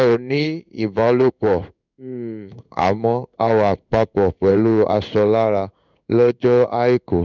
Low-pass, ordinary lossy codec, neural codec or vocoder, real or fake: 7.2 kHz; none; codec, 16 kHz in and 24 kHz out, 1 kbps, XY-Tokenizer; fake